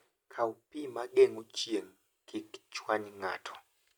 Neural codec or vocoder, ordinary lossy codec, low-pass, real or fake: none; none; none; real